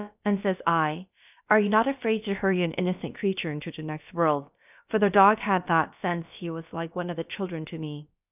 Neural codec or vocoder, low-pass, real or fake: codec, 16 kHz, about 1 kbps, DyCAST, with the encoder's durations; 3.6 kHz; fake